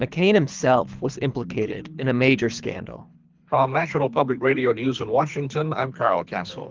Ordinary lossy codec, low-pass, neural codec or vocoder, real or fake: Opus, 24 kbps; 7.2 kHz; codec, 24 kHz, 3 kbps, HILCodec; fake